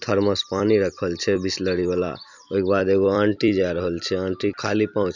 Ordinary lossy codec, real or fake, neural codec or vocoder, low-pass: none; fake; vocoder, 44.1 kHz, 128 mel bands every 256 samples, BigVGAN v2; 7.2 kHz